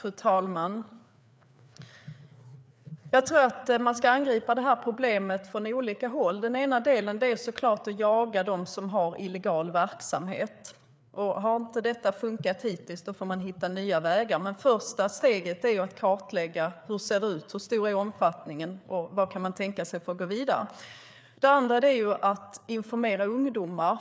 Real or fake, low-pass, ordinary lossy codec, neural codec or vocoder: fake; none; none; codec, 16 kHz, 4 kbps, FreqCodec, larger model